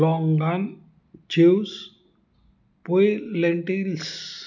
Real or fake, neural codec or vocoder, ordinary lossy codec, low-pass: real; none; none; 7.2 kHz